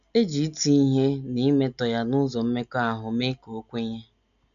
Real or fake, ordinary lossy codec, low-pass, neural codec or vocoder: real; none; 7.2 kHz; none